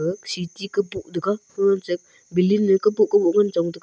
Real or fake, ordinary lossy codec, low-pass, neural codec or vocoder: real; none; none; none